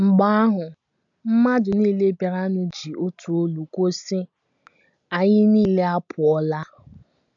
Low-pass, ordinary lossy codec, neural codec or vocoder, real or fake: 7.2 kHz; AAC, 64 kbps; none; real